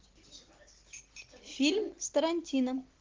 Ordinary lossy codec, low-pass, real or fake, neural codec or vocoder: Opus, 16 kbps; 7.2 kHz; real; none